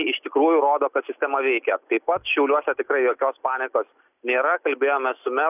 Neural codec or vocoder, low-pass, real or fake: none; 3.6 kHz; real